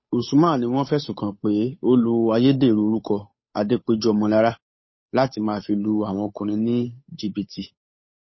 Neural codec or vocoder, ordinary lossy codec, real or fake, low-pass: codec, 16 kHz, 8 kbps, FunCodec, trained on Chinese and English, 25 frames a second; MP3, 24 kbps; fake; 7.2 kHz